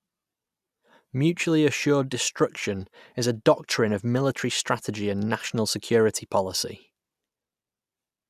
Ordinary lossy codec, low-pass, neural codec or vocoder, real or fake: none; 14.4 kHz; none; real